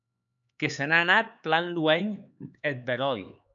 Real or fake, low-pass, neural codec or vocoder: fake; 7.2 kHz; codec, 16 kHz, 4 kbps, X-Codec, HuBERT features, trained on LibriSpeech